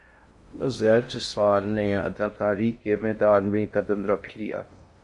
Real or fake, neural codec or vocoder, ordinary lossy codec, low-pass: fake; codec, 16 kHz in and 24 kHz out, 0.6 kbps, FocalCodec, streaming, 2048 codes; MP3, 48 kbps; 10.8 kHz